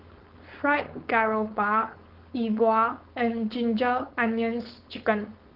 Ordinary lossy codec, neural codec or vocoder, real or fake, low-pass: Opus, 24 kbps; codec, 16 kHz, 4.8 kbps, FACodec; fake; 5.4 kHz